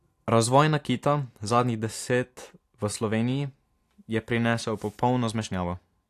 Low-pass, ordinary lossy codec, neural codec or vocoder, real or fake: 14.4 kHz; AAC, 64 kbps; none; real